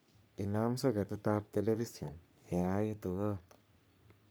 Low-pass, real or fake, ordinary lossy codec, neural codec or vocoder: none; fake; none; codec, 44.1 kHz, 3.4 kbps, Pupu-Codec